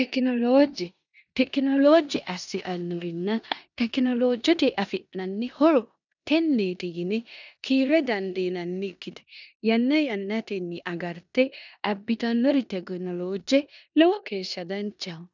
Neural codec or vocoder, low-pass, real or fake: codec, 16 kHz in and 24 kHz out, 0.9 kbps, LongCat-Audio-Codec, four codebook decoder; 7.2 kHz; fake